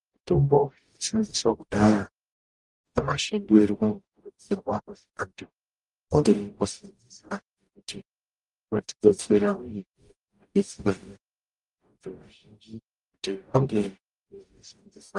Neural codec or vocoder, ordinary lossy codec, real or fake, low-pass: codec, 44.1 kHz, 0.9 kbps, DAC; Opus, 32 kbps; fake; 10.8 kHz